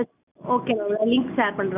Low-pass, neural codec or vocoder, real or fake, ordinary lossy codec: 3.6 kHz; none; real; none